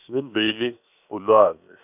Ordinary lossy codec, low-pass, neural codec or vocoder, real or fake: none; 3.6 kHz; codec, 16 kHz, 0.7 kbps, FocalCodec; fake